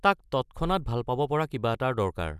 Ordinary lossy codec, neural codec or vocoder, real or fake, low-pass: none; none; real; 14.4 kHz